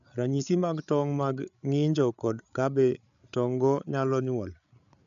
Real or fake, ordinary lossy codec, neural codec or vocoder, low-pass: fake; none; codec, 16 kHz, 8 kbps, FreqCodec, larger model; 7.2 kHz